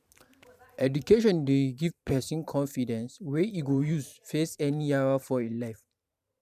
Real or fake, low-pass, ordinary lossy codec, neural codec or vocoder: fake; 14.4 kHz; none; vocoder, 44.1 kHz, 128 mel bands every 512 samples, BigVGAN v2